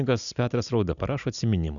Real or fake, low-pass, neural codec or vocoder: real; 7.2 kHz; none